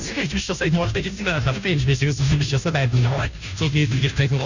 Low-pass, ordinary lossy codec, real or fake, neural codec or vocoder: 7.2 kHz; none; fake; codec, 16 kHz, 0.5 kbps, FunCodec, trained on Chinese and English, 25 frames a second